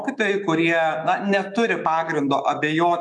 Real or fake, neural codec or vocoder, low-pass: fake; autoencoder, 48 kHz, 128 numbers a frame, DAC-VAE, trained on Japanese speech; 10.8 kHz